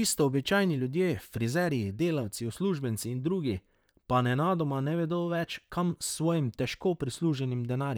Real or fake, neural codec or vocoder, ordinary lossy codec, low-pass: fake; vocoder, 44.1 kHz, 128 mel bands, Pupu-Vocoder; none; none